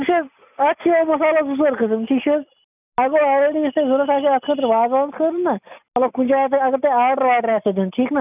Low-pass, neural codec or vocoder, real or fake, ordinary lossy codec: 3.6 kHz; none; real; none